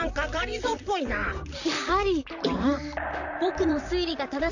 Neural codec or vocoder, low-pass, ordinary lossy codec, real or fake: vocoder, 44.1 kHz, 128 mel bands, Pupu-Vocoder; 7.2 kHz; none; fake